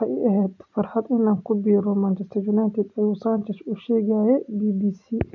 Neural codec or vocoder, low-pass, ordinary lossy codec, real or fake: none; 7.2 kHz; none; real